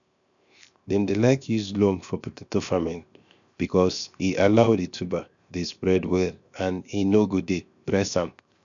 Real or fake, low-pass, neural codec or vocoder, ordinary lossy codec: fake; 7.2 kHz; codec, 16 kHz, 0.7 kbps, FocalCodec; MP3, 96 kbps